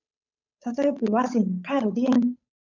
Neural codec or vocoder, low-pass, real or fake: codec, 16 kHz, 8 kbps, FunCodec, trained on Chinese and English, 25 frames a second; 7.2 kHz; fake